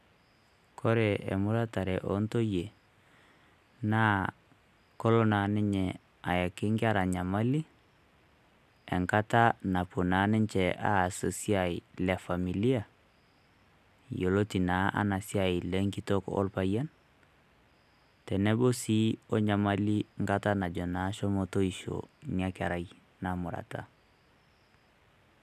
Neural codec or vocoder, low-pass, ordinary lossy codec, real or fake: none; 14.4 kHz; none; real